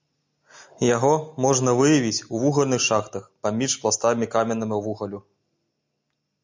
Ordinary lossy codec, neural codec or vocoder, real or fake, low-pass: MP3, 64 kbps; none; real; 7.2 kHz